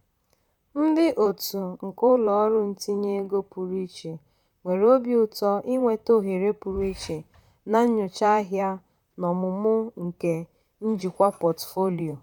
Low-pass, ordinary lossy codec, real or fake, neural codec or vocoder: 19.8 kHz; none; fake; vocoder, 44.1 kHz, 128 mel bands, Pupu-Vocoder